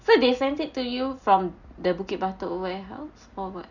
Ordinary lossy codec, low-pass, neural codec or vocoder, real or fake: none; 7.2 kHz; none; real